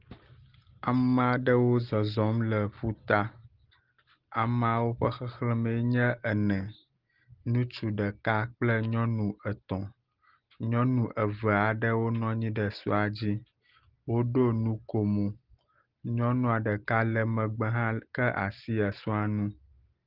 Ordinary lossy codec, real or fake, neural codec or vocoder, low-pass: Opus, 16 kbps; real; none; 5.4 kHz